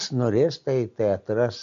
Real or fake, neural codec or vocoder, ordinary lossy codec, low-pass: real; none; MP3, 48 kbps; 7.2 kHz